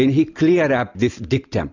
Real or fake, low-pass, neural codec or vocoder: real; 7.2 kHz; none